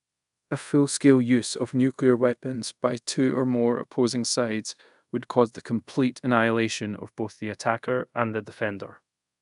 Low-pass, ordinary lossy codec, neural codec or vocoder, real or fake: 10.8 kHz; none; codec, 24 kHz, 0.5 kbps, DualCodec; fake